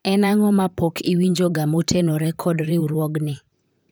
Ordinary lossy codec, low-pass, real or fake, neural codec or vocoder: none; none; fake; vocoder, 44.1 kHz, 128 mel bands, Pupu-Vocoder